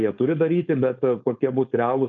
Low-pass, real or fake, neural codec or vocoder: 7.2 kHz; fake; codec, 16 kHz, 4.8 kbps, FACodec